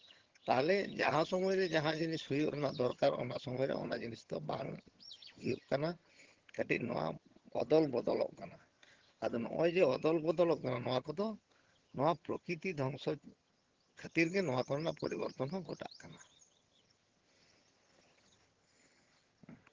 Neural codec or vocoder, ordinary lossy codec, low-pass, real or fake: vocoder, 22.05 kHz, 80 mel bands, HiFi-GAN; Opus, 16 kbps; 7.2 kHz; fake